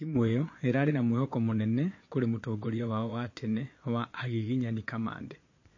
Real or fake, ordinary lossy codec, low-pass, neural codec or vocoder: fake; MP3, 32 kbps; 7.2 kHz; vocoder, 22.05 kHz, 80 mel bands, Vocos